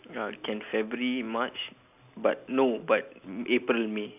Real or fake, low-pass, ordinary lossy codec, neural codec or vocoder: real; 3.6 kHz; none; none